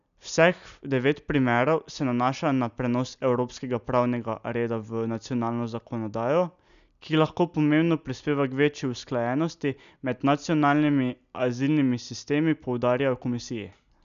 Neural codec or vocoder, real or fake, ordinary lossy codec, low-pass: none; real; none; 7.2 kHz